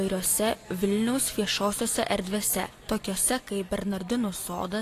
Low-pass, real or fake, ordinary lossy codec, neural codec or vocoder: 14.4 kHz; real; AAC, 48 kbps; none